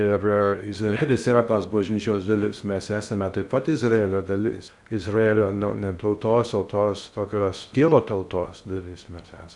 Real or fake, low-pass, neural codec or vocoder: fake; 10.8 kHz; codec, 16 kHz in and 24 kHz out, 0.6 kbps, FocalCodec, streaming, 4096 codes